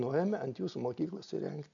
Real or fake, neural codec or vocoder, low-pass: real; none; 7.2 kHz